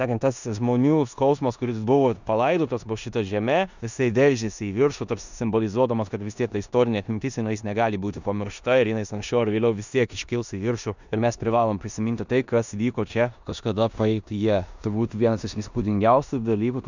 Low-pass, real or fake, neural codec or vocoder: 7.2 kHz; fake; codec, 16 kHz in and 24 kHz out, 0.9 kbps, LongCat-Audio-Codec, four codebook decoder